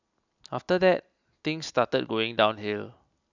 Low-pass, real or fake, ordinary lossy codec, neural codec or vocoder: 7.2 kHz; real; none; none